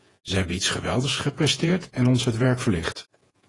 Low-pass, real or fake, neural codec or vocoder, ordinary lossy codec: 10.8 kHz; fake; vocoder, 48 kHz, 128 mel bands, Vocos; AAC, 32 kbps